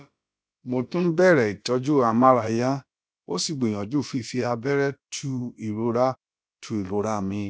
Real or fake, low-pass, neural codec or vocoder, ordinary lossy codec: fake; none; codec, 16 kHz, about 1 kbps, DyCAST, with the encoder's durations; none